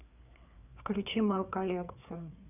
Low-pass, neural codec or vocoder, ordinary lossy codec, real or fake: 3.6 kHz; codec, 16 kHz, 4 kbps, FreqCodec, larger model; none; fake